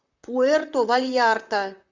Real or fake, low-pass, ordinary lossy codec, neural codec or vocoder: fake; 7.2 kHz; Opus, 64 kbps; vocoder, 44.1 kHz, 128 mel bands, Pupu-Vocoder